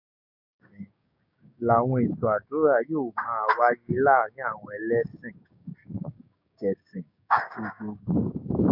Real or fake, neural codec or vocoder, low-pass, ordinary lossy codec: fake; codec, 16 kHz, 6 kbps, DAC; 5.4 kHz; none